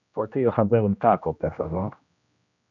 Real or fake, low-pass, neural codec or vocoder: fake; 7.2 kHz; codec, 16 kHz, 1 kbps, X-Codec, HuBERT features, trained on general audio